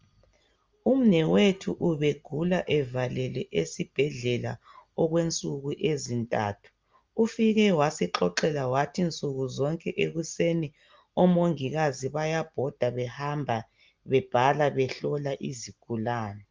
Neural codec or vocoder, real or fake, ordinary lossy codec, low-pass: none; real; Opus, 32 kbps; 7.2 kHz